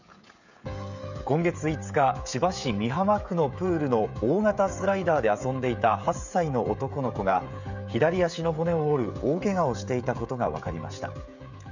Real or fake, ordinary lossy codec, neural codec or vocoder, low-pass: fake; none; codec, 16 kHz, 16 kbps, FreqCodec, smaller model; 7.2 kHz